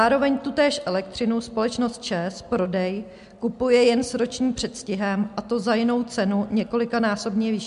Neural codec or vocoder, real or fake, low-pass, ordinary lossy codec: none; real; 10.8 kHz; MP3, 64 kbps